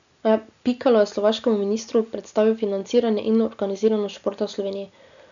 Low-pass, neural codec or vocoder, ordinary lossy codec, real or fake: 7.2 kHz; none; none; real